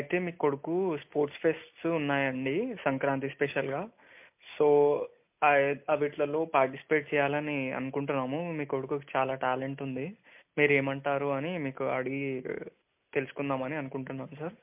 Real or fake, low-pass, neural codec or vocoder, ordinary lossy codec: real; 3.6 kHz; none; MP3, 32 kbps